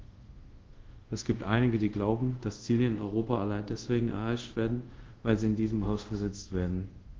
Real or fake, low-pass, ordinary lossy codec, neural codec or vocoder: fake; 7.2 kHz; Opus, 16 kbps; codec, 24 kHz, 0.5 kbps, DualCodec